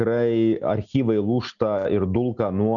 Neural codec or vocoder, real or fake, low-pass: none; real; 7.2 kHz